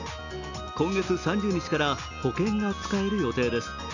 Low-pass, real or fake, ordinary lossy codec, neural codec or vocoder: 7.2 kHz; real; none; none